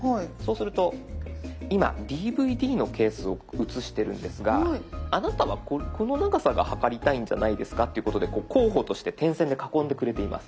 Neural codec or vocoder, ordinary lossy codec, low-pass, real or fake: none; none; none; real